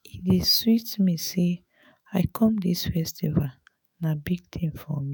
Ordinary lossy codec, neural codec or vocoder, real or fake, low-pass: none; autoencoder, 48 kHz, 128 numbers a frame, DAC-VAE, trained on Japanese speech; fake; none